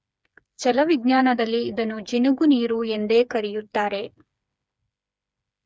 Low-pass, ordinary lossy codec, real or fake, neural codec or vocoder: none; none; fake; codec, 16 kHz, 4 kbps, FreqCodec, smaller model